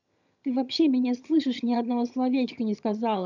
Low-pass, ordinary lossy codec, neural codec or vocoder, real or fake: 7.2 kHz; none; vocoder, 22.05 kHz, 80 mel bands, HiFi-GAN; fake